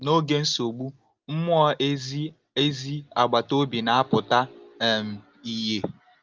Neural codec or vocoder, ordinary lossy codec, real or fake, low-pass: none; Opus, 24 kbps; real; 7.2 kHz